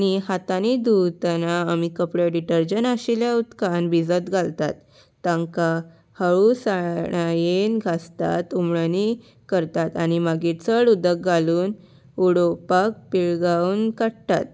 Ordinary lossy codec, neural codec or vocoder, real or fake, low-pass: none; none; real; none